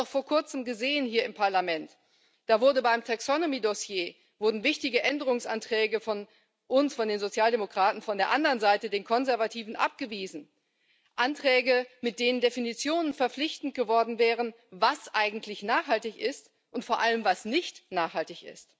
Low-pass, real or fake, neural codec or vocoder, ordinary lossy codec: none; real; none; none